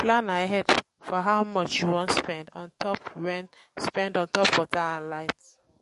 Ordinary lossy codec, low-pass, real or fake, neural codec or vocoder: MP3, 48 kbps; 14.4 kHz; fake; codec, 44.1 kHz, 7.8 kbps, DAC